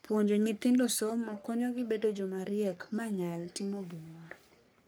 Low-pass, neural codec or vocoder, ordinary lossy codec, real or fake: none; codec, 44.1 kHz, 3.4 kbps, Pupu-Codec; none; fake